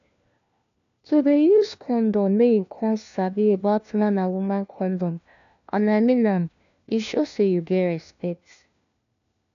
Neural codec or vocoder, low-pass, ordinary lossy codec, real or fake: codec, 16 kHz, 1 kbps, FunCodec, trained on LibriTTS, 50 frames a second; 7.2 kHz; AAC, 96 kbps; fake